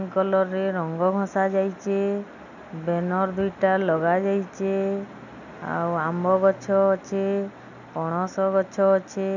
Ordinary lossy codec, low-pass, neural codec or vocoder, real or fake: none; 7.2 kHz; none; real